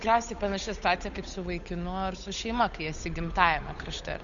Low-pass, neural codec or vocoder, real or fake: 7.2 kHz; codec, 16 kHz, 8 kbps, FunCodec, trained on Chinese and English, 25 frames a second; fake